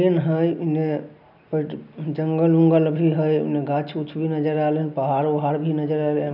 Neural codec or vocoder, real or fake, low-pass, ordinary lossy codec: none; real; 5.4 kHz; none